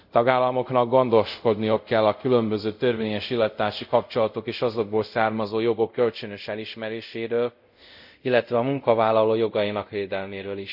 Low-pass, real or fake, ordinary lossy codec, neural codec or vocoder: 5.4 kHz; fake; none; codec, 24 kHz, 0.5 kbps, DualCodec